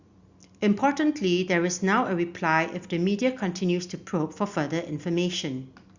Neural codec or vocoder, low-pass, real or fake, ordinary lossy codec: none; 7.2 kHz; real; Opus, 64 kbps